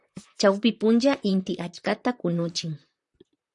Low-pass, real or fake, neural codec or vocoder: 10.8 kHz; fake; vocoder, 44.1 kHz, 128 mel bands, Pupu-Vocoder